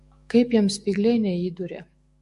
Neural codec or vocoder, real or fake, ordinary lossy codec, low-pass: autoencoder, 48 kHz, 128 numbers a frame, DAC-VAE, trained on Japanese speech; fake; MP3, 48 kbps; 14.4 kHz